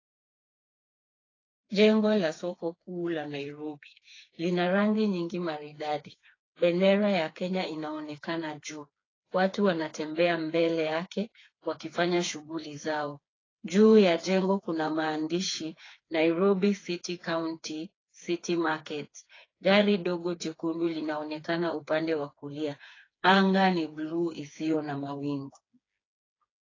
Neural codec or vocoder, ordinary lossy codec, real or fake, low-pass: codec, 16 kHz, 4 kbps, FreqCodec, smaller model; AAC, 32 kbps; fake; 7.2 kHz